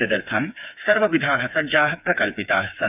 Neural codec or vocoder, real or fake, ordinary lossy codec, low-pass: codec, 16 kHz, 4 kbps, FreqCodec, smaller model; fake; none; 3.6 kHz